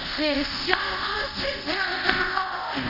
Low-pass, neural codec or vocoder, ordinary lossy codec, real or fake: 5.4 kHz; codec, 24 kHz, 0.5 kbps, DualCodec; none; fake